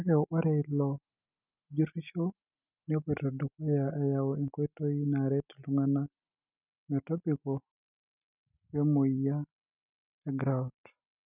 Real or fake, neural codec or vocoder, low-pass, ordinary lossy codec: real; none; 3.6 kHz; none